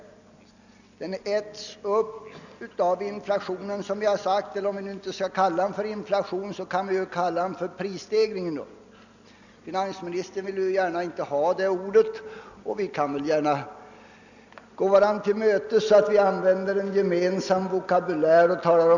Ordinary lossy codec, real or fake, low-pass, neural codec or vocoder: none; real; 7.2 kHz; none